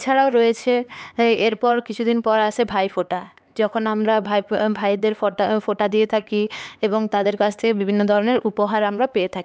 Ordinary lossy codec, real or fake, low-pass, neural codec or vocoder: none; fake; none; codec, 16 kHz, 4 kbps, X-Codec, HuBERT features, trained on LibriSpeech